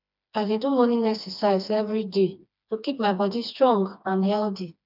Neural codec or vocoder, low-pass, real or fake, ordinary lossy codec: codec, 16 kHz, 2 kbps, FreqCodec, smaller model; 5.4 kHz; fake; none